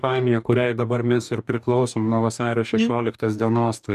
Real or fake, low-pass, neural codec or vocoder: fake; 14.4 kHz; codec, 44.1 kHz, 2.6 kbps, DAC